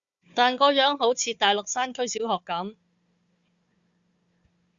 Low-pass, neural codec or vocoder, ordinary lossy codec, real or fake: 7.2 kHz; codec, 16 kHz, 4 kbps, FunCodec, trained on Chinese and English, 50 frames a second; Opus, 64 kbps; fake